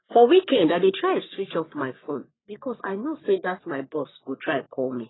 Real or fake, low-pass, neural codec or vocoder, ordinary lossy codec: fake; 7.2 kHz; codec, 44.1 kHz, 3.4 kbps, Pupu-Codec; AAC, 16 kbps